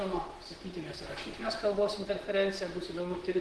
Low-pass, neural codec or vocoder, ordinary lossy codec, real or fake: 10.8 kHz; vocoder, 44.1 kHz, 128 mel bands, Pupu-Vocoder; Opus, 16 kbps; fake